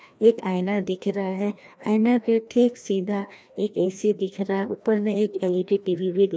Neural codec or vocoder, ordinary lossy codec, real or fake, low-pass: codec, 16 kHz, 1 kbps, FreqCodec, larger model; none; fake; none